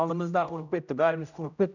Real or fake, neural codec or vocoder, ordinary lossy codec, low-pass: fake; codec, 16 kHz, 0.5 kbps, X-Codec, HuBERT features, trained on general audio; none; 7.2 kHz